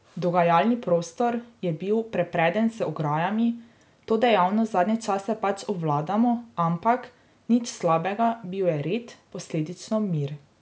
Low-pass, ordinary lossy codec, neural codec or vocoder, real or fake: none; none; none; real